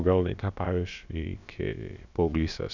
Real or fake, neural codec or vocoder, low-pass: fake; codec, 16 kHz, about 1 kbps, DyCAST, with the encoder's durations; 7.2 kHz